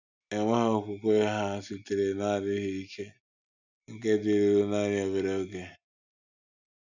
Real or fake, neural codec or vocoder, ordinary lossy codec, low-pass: real; none; none; 7.2 kHz